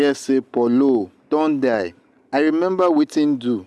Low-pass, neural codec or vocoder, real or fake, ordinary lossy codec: none; none; real; none